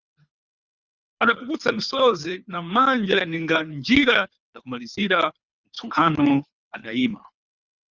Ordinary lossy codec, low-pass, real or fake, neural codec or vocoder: Opus, 64 kbps; 7.2 kHz; fake; codec, 24 kHz, 3 kbps, HILCodec